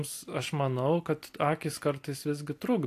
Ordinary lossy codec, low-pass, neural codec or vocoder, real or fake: AAC, 64 kbps; 14.4 kHz; none; real